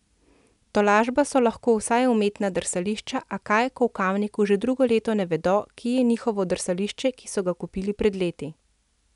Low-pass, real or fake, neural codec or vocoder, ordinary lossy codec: 10.8 kHz; real; none; none